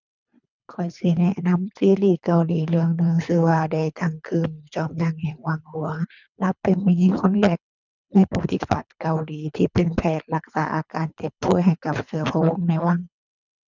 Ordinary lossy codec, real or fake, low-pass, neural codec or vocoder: none; fake; 7.2 kHz; codec, 24 kHz, 3 kbps, HILCodec